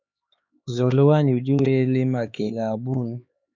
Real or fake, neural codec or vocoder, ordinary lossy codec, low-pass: fake; codec, 16 kHz, 4 kbps, X-Codec, HuBERT features, trained on LibriSpeech; MP3, 64 kbps; 7.2 kHz